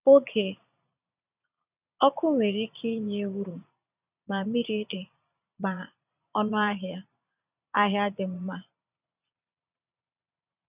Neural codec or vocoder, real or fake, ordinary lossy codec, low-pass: vocoder, 22.05 kHz, 80 mel bands, Vocos; fake; none; 3.6 kHz